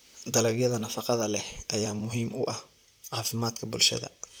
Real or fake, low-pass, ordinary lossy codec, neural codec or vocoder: fake; none; none; vocoder, 44.1 kHz, 128 mel bands, Pupu-Vocoder